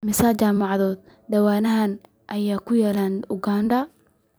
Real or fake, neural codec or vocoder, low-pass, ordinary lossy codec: fake; vocoder, 44.1 kHz, 128 mel bands every 256 samples, BigVGAN v2; none; none